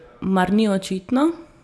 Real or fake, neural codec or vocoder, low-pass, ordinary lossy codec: real; none; none; none